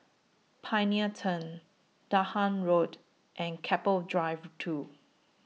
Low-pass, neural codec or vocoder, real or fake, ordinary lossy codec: none; none; real; none